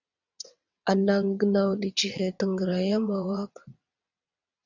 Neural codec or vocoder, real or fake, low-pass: vocoder, 22.05 kHz, 80 mel bands, WaveNeXt; fake; 7.2 kHz